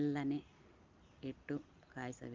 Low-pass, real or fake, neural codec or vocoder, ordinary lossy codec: 7.2 kHz; real; none; Opus, 24 kbps